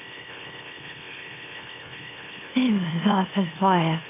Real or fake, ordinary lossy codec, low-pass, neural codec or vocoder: fake; AAC, 24 kbps; 3.6 kHz; autoencoder, 44.1 kHz, a latent of 192 numbers a frame, MeloTTS